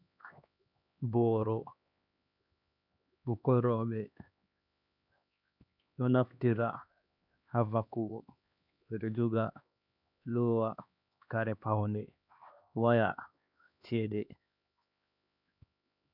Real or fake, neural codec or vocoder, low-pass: fake; codec, 16 kHz, 2 kbps, X-Codec, HuBERT features, trained on LibriSpeech; 5.4 kHz